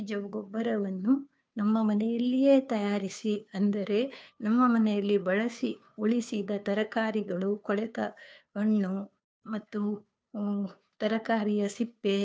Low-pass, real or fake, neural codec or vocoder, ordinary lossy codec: none; fake; codec, 16 kHz, 2 kbps, FunCodec, trained on Chinese and English, 25 frames a second; none